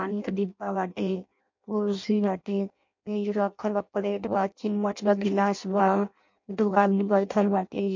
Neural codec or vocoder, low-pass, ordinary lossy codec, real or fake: codec, 16 kHz in and 24 kHz out, 0.6 kbps, FireRedTTS-2 codec; 7.2 kHz; MP3, 48 kbps; fake